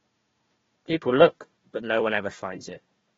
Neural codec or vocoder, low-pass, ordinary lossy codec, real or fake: codec, 16 kHz, 1 kbps, FunCodec, trained on Chinese and English, 50 frames a second; 7.2 kHz; AAC, 24 kbps; fake